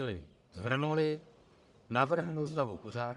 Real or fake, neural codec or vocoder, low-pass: fake; codec, 44.1 kHz, 1.7 kbps, Pupu-Codec; 10.8 kHz